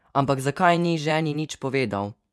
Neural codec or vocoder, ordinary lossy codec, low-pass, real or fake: vocoder, 24 kHz, 100 mel bands, Vocos; none; none; fake